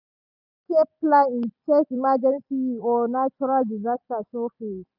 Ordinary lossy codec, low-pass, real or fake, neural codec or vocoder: none; 5.4 kHz; real; none